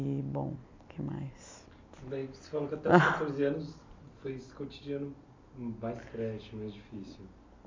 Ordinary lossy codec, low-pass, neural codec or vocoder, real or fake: MP3, 64 kbps; 7.2 kHz; none; real